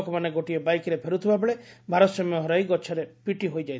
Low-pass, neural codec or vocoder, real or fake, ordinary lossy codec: none; none; real; none